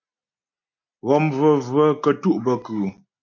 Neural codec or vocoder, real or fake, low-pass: none; real; 7.2 kHz